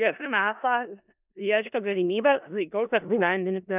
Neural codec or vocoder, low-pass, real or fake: codec, 16 kHz in and 24 kHz out, 0.4 kbps, LongCat-Audio-Codec, four codebook decoder; 3.6 kHz; fake